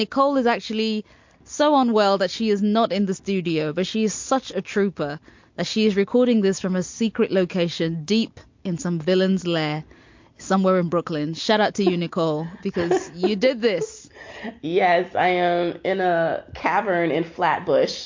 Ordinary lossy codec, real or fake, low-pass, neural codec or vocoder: MP3, 48 kbps; real; 7.2 kHz; none